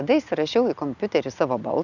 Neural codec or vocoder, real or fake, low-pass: none; real; 7.2 kHz